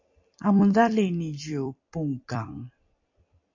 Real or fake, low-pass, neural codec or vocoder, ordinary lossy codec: fake; 7.2 kHz; vocoder, 44.1 kHz, 128 mel bands every 256 samples, BigVGAN v2; AAC, 48 kbps